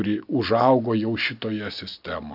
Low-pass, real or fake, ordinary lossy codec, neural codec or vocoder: 5.4 kHz; real; AAC, 48 kbps; none